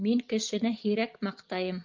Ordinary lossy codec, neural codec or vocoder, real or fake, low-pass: Opus, 24 kbps; none; real; 7.2 kHz